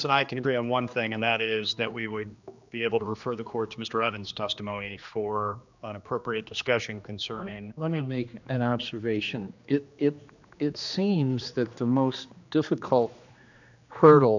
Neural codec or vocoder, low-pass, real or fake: codec, 16 kHz, 2 kbps, X-Codec, HuBERT features, trained on general audio; 7.2 kHz; fake